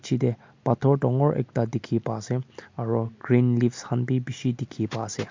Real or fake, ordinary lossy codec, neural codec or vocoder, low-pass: real; MP3, 48 kbps; none; 7.2 kHz